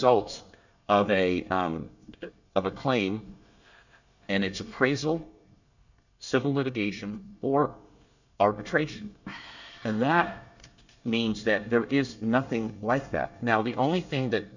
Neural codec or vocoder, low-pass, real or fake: codec, 24 kHz, 1 kbps, SNAC; 7.2 kHz; fake